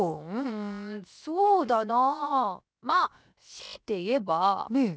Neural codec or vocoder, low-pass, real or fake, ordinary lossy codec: codec, 16 kHz, 0.7 kbps, FocalCodec; none; fake; none